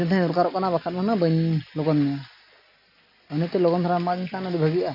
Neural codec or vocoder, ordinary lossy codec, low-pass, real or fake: none; none; 5.4 kHz; real